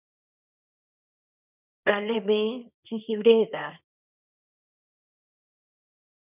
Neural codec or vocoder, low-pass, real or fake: codec, 24 kHz, 0.9 kbps, WavTokenizer, small release; 3.6 kHz; fake